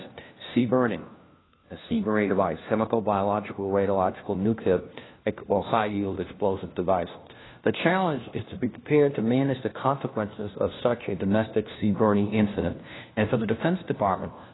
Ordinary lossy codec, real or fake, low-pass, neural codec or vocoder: AAC, 16 kbps; fake; 7.2 kHz; codec, 16 kHz, 1 kbps, FunCodec, trained on LibriTTS, 50 frames a second